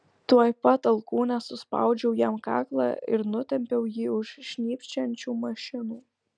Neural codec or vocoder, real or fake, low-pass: none; real; 9.9 kHz